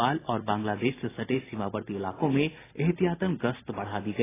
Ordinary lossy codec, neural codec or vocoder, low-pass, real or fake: AAC, 16 kbps; none; 3.6 kHz; real